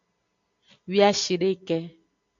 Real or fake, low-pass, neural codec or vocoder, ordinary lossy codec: real; 7.2 kHz; none; AAC, 64 kbps